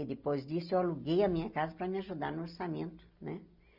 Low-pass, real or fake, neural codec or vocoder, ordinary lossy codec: 5.4 kHz; real; none; none